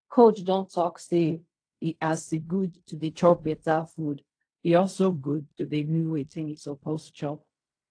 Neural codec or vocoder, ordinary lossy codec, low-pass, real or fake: codec, 16 kHz in and 24 kHz out, 0.4 kbps, LongCat-Audio-Codec, fine tuned four codebook decoder; AAC, 48 kbps; 9.9 kHz; fake